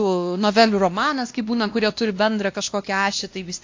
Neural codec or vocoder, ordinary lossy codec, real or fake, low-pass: codec, 16 kHz, 1 kbps, X-Codec, WavLM features, trained on Multilingual LibriSpeech; AAC, 48 kbps; fake; 7.2 kHz